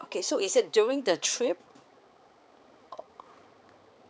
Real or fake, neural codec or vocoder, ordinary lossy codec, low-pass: fake; codec, 16 kHz, 4 kbps, X-Codec, HuBERT features, trained on balanced general audio; none; none